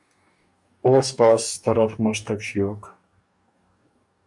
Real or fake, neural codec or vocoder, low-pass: fake; codec, 44.1 kHz, 2.6 kbps, DAC; 10.8 kHz